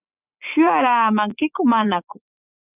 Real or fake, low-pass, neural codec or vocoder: fake; 3.6 kHz; vocoder, 24 kHz, 100 mel bands, Vocos